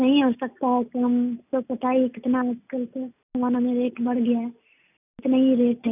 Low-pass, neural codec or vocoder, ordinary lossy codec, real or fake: 3.6 kHz; none; none; real